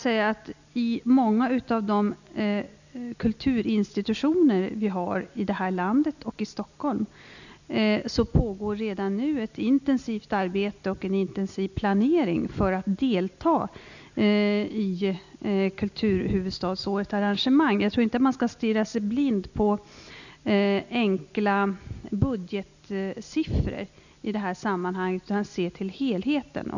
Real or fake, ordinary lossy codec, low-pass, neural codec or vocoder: real; none; 7.2 kHz; none